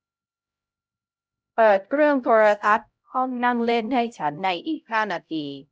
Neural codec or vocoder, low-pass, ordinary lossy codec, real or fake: codec, 16 kHz, 0.5 kbps, X-Codec, HuBERT features, trained on LibriSpeech; none; none; fake